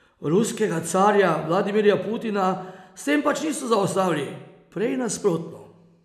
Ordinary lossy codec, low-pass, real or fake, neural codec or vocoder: none; 14.4 kHz; real; none